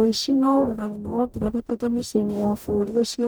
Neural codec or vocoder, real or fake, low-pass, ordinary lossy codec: codec, 44.1 kHz, 0.9 kbps, DAC; fake; none; none